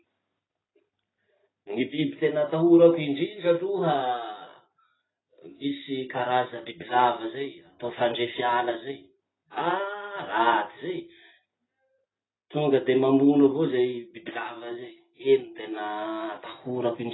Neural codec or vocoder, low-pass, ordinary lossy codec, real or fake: none; 7.2 kHz; AAC, 16 kbps; real